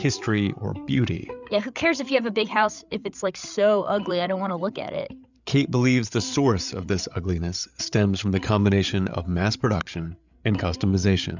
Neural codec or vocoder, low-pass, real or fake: codec, 16 kHz, 8 kbps, FreqCodec, larger model; 7.2 kHz; fake